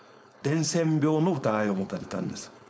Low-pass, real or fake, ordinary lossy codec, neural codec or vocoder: none; fake; none; codec, 16 kHz, 4.8 kbps, FACodec